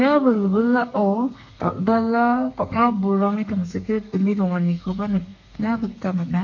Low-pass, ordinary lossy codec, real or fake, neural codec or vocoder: 7.2 kHz; none; fake; codec, 32 kHz, 1.9 kbps, SNAC